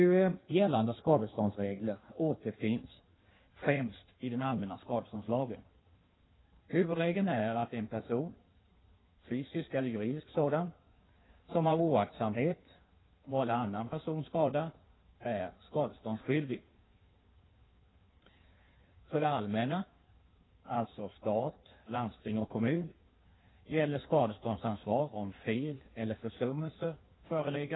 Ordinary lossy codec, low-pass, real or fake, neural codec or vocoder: AAC, 16 kbps; 7.2 kHz; fake; codec, 16 kHz in and 24 kHz out, 1.1 kbps, FireRedTTS-2 codec